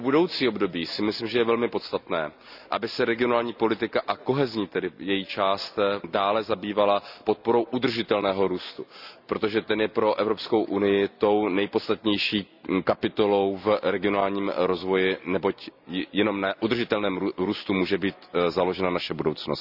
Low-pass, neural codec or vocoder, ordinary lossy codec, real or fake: 5.4 kHz; none; none; real